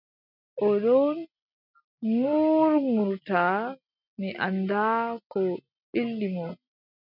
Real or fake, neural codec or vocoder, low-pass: real; none; 5.4 kHz